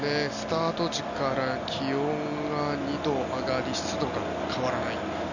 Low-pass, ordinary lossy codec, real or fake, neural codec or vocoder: 7.2 kHz; none; real; none